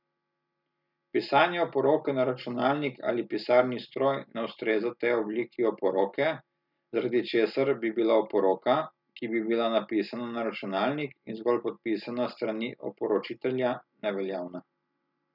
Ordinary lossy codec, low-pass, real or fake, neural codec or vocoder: none; 5.4 kHz; real; none